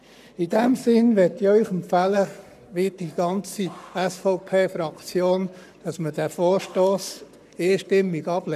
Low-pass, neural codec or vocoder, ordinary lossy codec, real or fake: 14.4 kHz; codec, 44.1 kHz, 7.8 kbps, Pupu-Codec; none; fake